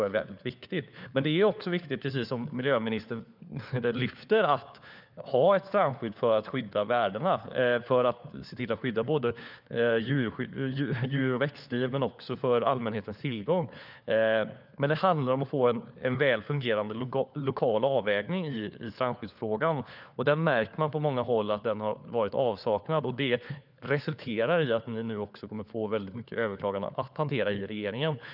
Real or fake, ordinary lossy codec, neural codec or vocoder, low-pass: fake; none; codec, 16 kHz, 4 kbps, FunCodec, trained on LibriTTS, 50 frames a second; 5.4 kHz